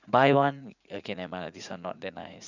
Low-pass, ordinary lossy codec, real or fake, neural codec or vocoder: 7.2 kHz; none; fake; vocoder, 44.1 kHz, 80 mel bands, Vocos